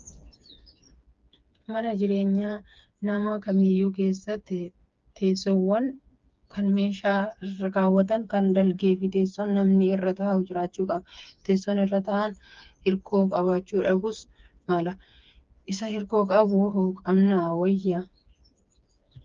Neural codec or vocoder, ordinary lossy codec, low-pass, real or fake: codec, 16 kHz, 4 kbps, FreqCodec, smaller model; Opus, 24 kbps; 7.2 kHz; fake